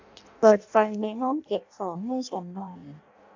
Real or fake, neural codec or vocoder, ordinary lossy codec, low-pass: fake; codec, 16 kHz in and 24 kHz out, 0.6 kbps, FireRedTTS-2 codec; AAC, 48 kbps; 7.2 kHz